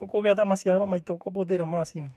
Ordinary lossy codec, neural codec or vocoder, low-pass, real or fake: none; codec, 44.1 kHz, 2.6 kbps, DAC; 14.4 kHz; fake